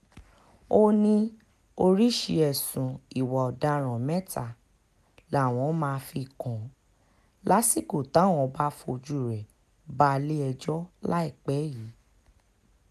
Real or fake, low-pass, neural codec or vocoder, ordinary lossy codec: real; 14.4 kHz; none; none